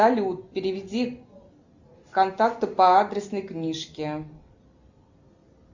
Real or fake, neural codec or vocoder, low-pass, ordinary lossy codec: real; none; 7.2 kHz; Opus, 64 kbps